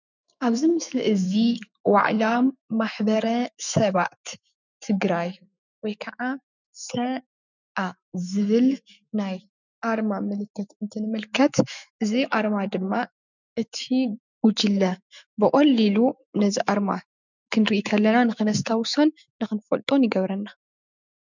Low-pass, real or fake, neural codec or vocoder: 7.2 kHz; fake; autoencoder, 48 kHz, 128 numbers a frame, DAC-VAE, trained on Japanese speech